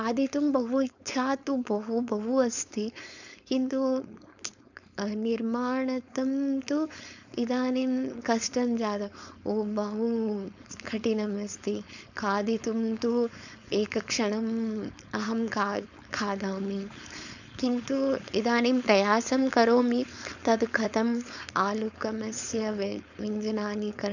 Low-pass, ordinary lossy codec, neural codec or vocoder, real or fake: 7.2 kHz; none; codec, 16 kHz, 4.8 kbps, FACodec; fake